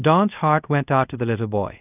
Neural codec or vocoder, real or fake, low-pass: codec, 24 kHz, 0.5 kbps, DualCodec; fake; 3.6 kHz